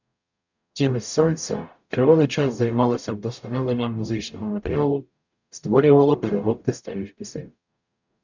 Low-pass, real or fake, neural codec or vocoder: 7.2 kHz; fake; codec, 44.1 kHz, 0.9 kbps, DAC